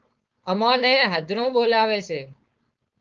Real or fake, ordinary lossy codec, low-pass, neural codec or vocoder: fake; Opus, 24 kbps; 7.2 kHz; codec, 16 kHz, 4.8 kbps, FACodec